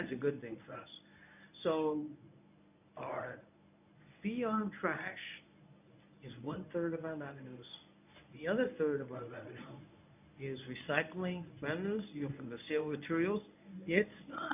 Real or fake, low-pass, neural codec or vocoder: fake; 3.6 kHz; codec, 24 kHz, 0.9 kbps, WavTokenizer, medium speech release version 1